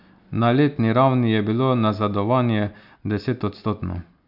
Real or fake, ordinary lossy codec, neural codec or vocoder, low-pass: real; none; none; 5.4 kHz